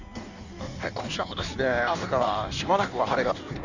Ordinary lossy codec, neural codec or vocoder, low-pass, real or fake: none; codec, 16 kHz in and 24 kHz out, 1.1 kbps, FireRedTTS-2 codec; 7.2 kHz; fake